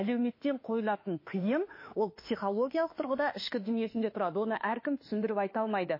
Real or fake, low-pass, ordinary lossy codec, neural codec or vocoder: fake; 5.4 kHz; MP3, 24 kbps; autoencoder, 48 kHz, 32 numbers a frame, DAC-VAE, trained on Japanese speech